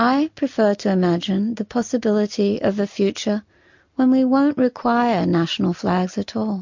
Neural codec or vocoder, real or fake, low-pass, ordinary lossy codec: none; real; 7.2 kHz; MP3, 48 kbps